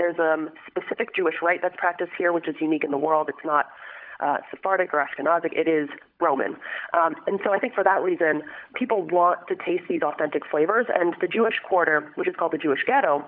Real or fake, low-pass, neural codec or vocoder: fake; 5.4 kHz; codec, 16 kHz, 16 kbps, FunCodec, trained on LibriTTS, 50 frames a second